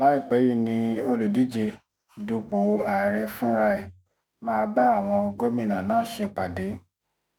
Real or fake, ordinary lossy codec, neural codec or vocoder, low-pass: fake; none; autoencoder, 48 kHz, 32 numbers a frame, DAC-VAE, trained on Japanese speech; none